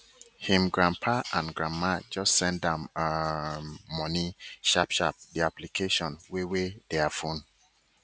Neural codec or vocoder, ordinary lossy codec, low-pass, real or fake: none; none; none; real